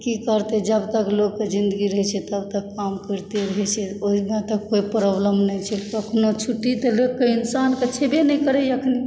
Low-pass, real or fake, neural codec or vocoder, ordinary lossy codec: none; real; none; none